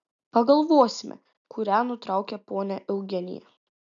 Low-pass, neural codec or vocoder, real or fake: 7.2 kHz; none; real